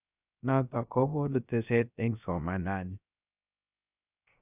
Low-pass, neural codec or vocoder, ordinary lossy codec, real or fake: 3.6 kHz; codec, 16 kHz, 0.3 kbps, FocalCodec; none; fake